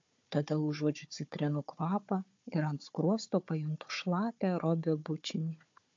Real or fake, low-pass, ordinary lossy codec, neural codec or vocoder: fake; 7.2 kHz; MP3, 48 kbps; codec, 16 kHz, 4 kbps, FunCodec, trained on Chinese and English, 50 frames a second